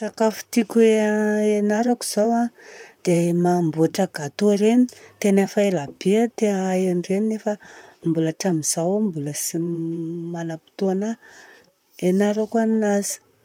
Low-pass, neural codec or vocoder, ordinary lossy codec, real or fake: 19.8 kHz; vocoder, 44.1 kHz, 128 mel bands, Pupu-Vocoder; none; fake